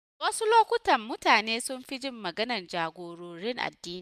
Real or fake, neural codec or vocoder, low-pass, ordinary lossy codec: real; none; 14.4 kHz; none